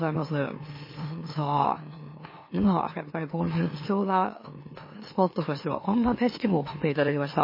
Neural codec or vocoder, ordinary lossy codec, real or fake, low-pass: autoencoder, 44.1 kHz, a latent of 192 numbers a frame, MeloTTS; MP3, 24 kbps; fake; 5.4 kHz